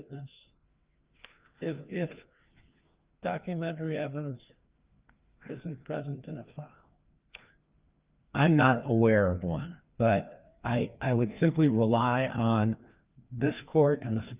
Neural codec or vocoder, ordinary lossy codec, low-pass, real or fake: codec, 16 kHz, 2 kbps, FreqCodec, larger model; Opus, 24 kbps; 3.6 kHz; fake